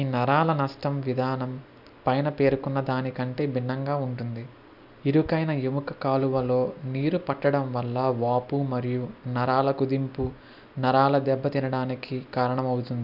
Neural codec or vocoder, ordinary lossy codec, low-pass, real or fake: none; none; 5.4 kHz; real